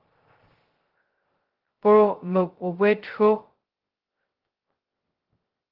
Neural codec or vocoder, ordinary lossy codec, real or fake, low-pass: codec, 16 kHz, 0.2 kbps, FocalCodec; Opus, 16 kbps; fake; 5.4 kHz